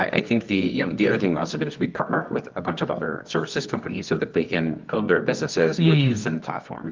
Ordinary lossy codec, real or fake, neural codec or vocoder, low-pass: Opus, 24 kbps; fake; codec, 24 kHz, 0.9 kbps, WavTokenizer, medium music audio release; 7.2 kHz